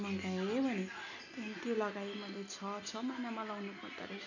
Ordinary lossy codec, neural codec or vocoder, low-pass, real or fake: none; none; 7.2 kHz; real